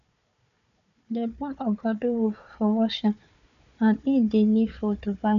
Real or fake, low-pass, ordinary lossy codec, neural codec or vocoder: fake; 7.2 kHz; none; codec, 16 kHz, 4 kbps, FunCodec, trained on Chinese and English, 50 frames a second